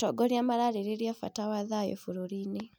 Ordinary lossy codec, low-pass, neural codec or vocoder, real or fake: none; none; none; real